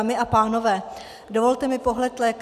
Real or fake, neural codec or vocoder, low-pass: real; none; 14.4 kHz